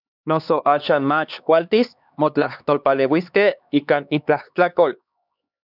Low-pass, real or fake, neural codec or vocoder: 5.4 kHz; fake; codec, 16 kHz, 2 kbps, X-Codec, HuBERT features, trained on LibriSpeech